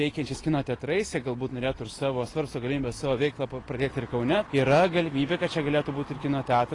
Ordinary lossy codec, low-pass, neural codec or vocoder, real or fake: AAC, 32 kbps; 10.8 kHz; none; real